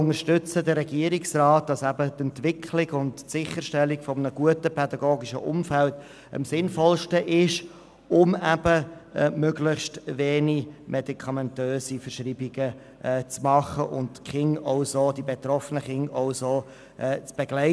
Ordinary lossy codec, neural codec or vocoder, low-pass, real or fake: none; none; none; real